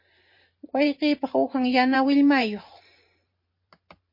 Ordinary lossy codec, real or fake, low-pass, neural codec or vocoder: MP3, 32 kbps; fake; 5.4 kHz; vocoder, 44.1 kHz, 128 mel bands every 512 samples, BigVGAN v2